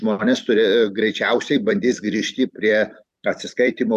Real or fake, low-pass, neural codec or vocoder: fake; 14.4 kHz; vocoder, 44.1 kHz, 128 mel bands every 256 samples, BigVGAN v2